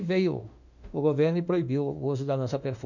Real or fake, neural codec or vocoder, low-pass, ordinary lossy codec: fake; autoencoder, 48 kHz, 32 numbers a frame, DAC-VAE, trained on Japanese speech; 7.2 kHz; none